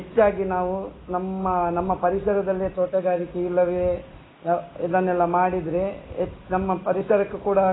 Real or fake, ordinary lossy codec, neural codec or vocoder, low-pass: real; AAC, 16 kbps; none; 7.2 kHz